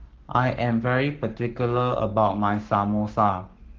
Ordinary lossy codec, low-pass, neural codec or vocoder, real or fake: Opus, 16 kbps; 7.2 kHz; codec, 44.1 kHz, 7.8 kbps, Pupu-Codec; fake